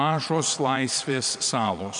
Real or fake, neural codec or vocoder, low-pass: fake; vocoder, 22.05 kHz, 80 mel bands, Vocos; 9.9 kHz